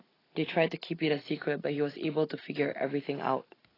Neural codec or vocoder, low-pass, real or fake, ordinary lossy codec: none; 5.4 kHz; real; AAC, 24 kbps